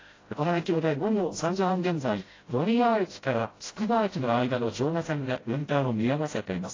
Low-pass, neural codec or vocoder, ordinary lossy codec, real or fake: 7.2 kHz; codec, 16 kHz, 0.5 kbps, FreqCodec, smaller model; AAC, 32 kbps; fake